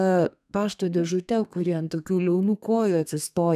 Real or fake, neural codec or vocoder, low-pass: fake; codec, 32 kHz, 1.9 kbps, SNAC; 14.4 kHz